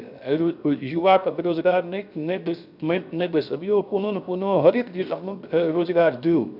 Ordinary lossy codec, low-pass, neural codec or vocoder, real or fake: none; 5.4 kHz; codec, 16 kHz, 0.7 kbps, FocalCodec; fake